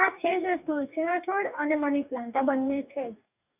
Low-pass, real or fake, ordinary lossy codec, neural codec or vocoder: 3.6 kHz; fake; none; codec, 44.1 kHz, 2.6 kbps, DAC